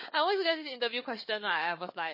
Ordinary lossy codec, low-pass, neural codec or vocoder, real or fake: MP3, 24 kbps; 5.4 kHz; codec, 16 kHz, 4 kbps, FunCodec, trained on Chinese and English, 50 frames a second; fake